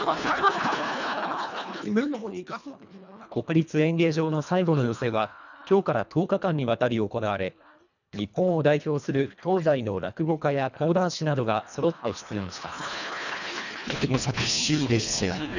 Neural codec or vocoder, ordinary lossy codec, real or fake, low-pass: codec, 24 kHz, 1.5 kbps, HILCodec; none; fake; 7.2 kHz